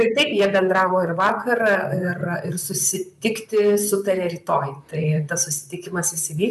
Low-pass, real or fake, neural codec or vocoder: 14.4 kHz; fake; vocoder, 44.1 kHz, 128 mel bands, Pupu-Vocoder